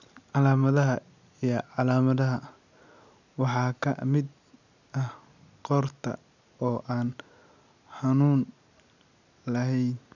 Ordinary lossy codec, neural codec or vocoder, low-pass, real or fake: none; none; 7.2 kHz; real